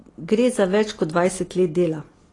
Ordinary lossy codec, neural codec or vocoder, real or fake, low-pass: AAC, 32 kbps; none; real; 10.8 kHz